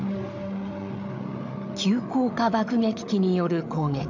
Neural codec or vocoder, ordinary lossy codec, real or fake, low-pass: codec, 16 kHz, 8 kbps, FreqCodec, larger model; AAC, 48 kbps; fake; 7.2 kHz